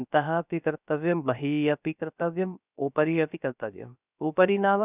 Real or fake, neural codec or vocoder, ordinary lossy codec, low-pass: fake; codec, 16 kHz, 0.3 kbps, FocalCodec; none; 3.6 kHz